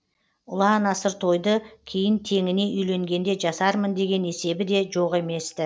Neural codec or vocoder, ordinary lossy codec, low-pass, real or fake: none; none; none; real